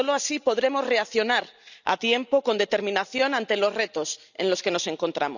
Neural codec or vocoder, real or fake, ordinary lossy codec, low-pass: none; real; none; 7.2 kHz